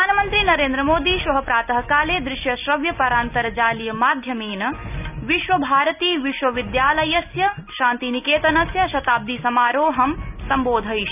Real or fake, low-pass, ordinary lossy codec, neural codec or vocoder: real; 3.6 kHz; none; none